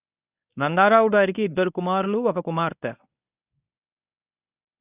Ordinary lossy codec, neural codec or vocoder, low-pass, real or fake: none; codec, 24 kHz, 0.9 kbps, WavTokenizer, medium speech release version 1; 3.6 kHz; fake